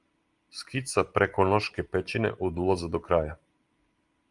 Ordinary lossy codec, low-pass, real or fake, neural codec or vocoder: Opus, 32 kbps; 10.8 kHz; real; none